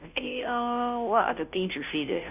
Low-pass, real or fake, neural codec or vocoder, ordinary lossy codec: 3.6 kHz; fake; codec, 16 kHz, 0.5 kbps, FunCodec, trained on Chinese and English, 25 frames a second; none